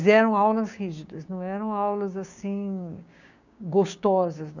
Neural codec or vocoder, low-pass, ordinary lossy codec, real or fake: codec, 16 kHz, 6 kbps, DAC; 7.2 kHz; none; fake